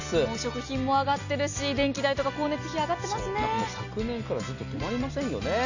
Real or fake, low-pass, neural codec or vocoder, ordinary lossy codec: real; 7.2 kHz; none; none